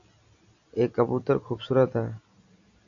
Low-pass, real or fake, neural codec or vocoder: 7.2 kHz; real; none